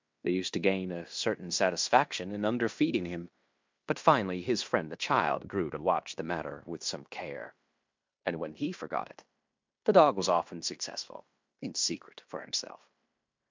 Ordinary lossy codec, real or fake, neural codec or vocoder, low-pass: MP3, 64 kbps; fake; codec, 16 kHz in and 24 kHz out, 0.9 kbps, LongCat-Audio-Codec, fine tuned four codebook decoder; 7.2 kHz